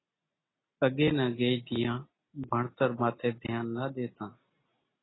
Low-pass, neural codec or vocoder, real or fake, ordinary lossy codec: 7.2 kHz; none; real; AAC, 16 kbps